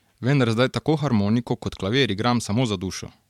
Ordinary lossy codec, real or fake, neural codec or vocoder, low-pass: MP3, 96 kbps; fake; vocoder, 44.1 kHz, 128 mel bands every 512 samples, BigVGAN v2; 19.8 kHz